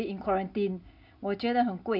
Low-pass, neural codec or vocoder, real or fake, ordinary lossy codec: 5.4 kHz; none; real; none